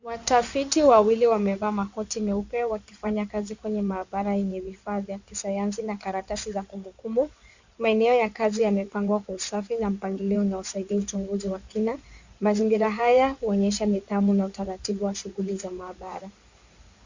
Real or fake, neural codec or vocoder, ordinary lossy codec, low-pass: fake; codec, 16 kHz in and 24 kHz out, 2.2 kbps, FireRedTTS-2 codec; Opus, 64 kbps; 7.2 kHz